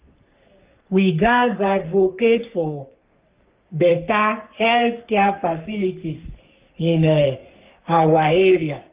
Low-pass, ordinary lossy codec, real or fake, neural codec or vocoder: 3.6 kHz; Opus, 24 kbps; fake; codec, 44.1 kHz, 3.4 kbps, Pupu-Codec